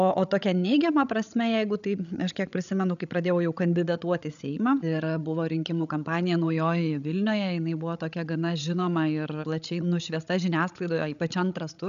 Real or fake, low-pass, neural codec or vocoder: fake; 7.2 kHz; codec, 16 kHz, 16 kbps, FreqCodec, larger model